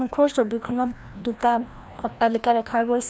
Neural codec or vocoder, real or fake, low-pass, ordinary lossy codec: codec, 16 kHz, 1 kbps, FreqCodec, larger model; fake; none; none